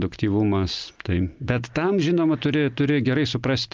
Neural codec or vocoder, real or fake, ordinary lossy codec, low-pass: none; real; Opus, 32 kbps; 7.2 kHz